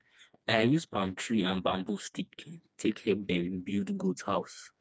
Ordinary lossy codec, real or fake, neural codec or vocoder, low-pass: none; fake; codec, 16 kHz, 2 kbps, FreqCodec, smaller model; none